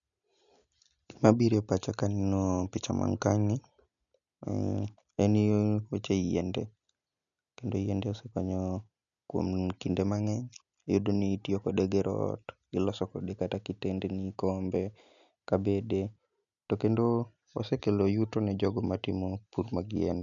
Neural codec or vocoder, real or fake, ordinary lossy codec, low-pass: none; real; none; 7.2 kHz